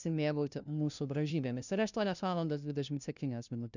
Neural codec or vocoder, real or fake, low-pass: codec, 16 kHz, 0.5 kbps, FunCodec, trained on LibriTTS, 25 frames a second; fake; 7.2 kHz